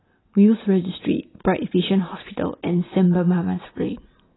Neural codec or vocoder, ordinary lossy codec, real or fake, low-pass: none; AAC, 16 kbps; real; 7.2 kHz